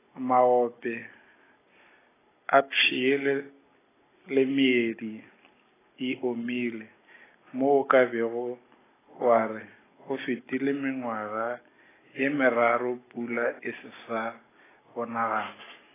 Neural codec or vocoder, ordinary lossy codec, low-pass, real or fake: none; AAC, 16 kbps; 3.6 kHz; real